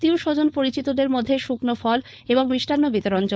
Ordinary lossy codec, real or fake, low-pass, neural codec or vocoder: none; fake; none; codec, 16 kHz, 4.8 kbps, FACodec